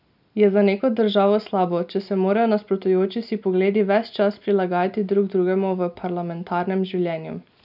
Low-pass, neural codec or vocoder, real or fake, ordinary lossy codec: 5.4 kHz; none; real; none